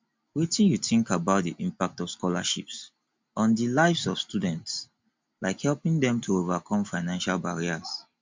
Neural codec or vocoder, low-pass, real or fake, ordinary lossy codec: none; 7.2 kHz; real; MP3, 64 kbps